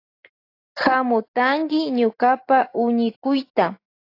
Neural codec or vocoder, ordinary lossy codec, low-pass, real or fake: none; AAC, 32 kbps; 5.4 kHz; real